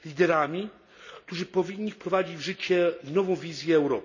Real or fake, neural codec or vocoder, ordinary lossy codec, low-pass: real; none; none; 7.2 kHz